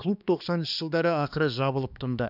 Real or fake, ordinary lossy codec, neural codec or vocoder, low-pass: fake; none; codec, 16 kHz, 2 kbps, X-Codec, HuBERT features, trained on balanced general audio; 5.4 kHz